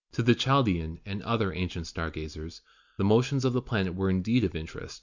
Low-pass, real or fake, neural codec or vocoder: 7.2 kHz; real; none